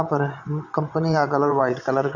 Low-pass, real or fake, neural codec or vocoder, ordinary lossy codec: 7.2 kHz; fake; vocoder, 22.05 kHz, 80 mel bands, WaveNeXt; none